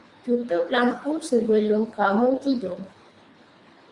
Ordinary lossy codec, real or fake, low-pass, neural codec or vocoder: Opus, 64 kbps; fake; 10.8 kHz; codec, 24 kHz, 3 kbps, HILCodec